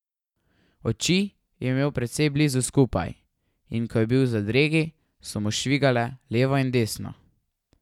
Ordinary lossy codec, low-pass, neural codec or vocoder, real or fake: none; 19.8 kHz; none; real